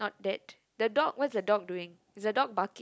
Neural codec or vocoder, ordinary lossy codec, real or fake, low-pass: none; none; real; none